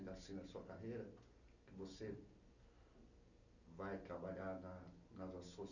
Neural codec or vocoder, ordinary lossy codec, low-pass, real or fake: none; none; 7.2 kHz; real